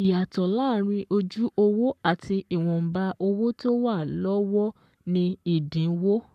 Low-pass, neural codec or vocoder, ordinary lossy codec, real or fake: 14.4 kHz; codec, 44.1 kHz, 7.8 kbps, Pupu-Codec; none; fake